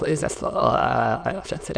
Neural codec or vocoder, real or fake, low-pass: autoencoder, 22.05 kHz, a latent of 192 numbers a frame, VITS, trained on many speakers; fake; 9.9 kHz